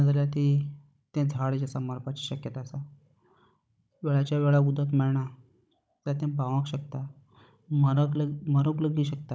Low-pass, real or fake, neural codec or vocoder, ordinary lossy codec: none; fake; codec, 16 kHz, 16 kbps, FunCodec, trained on Chinese and English, 50 frames a second; none